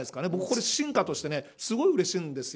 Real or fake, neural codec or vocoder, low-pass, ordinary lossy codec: real; none; none; none